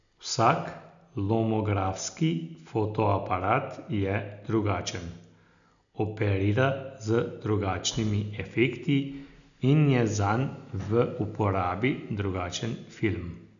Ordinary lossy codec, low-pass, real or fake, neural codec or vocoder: none; 7.2 kHz; real; none